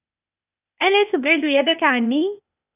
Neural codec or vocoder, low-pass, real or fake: codec, 16 kHz, 0.8 kbps, ZipCodec; 3.6 kHz; fake